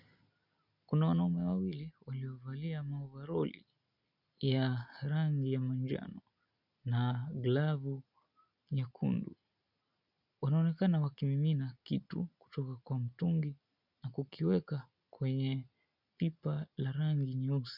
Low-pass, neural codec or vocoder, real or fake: 5.4 kHz; none; real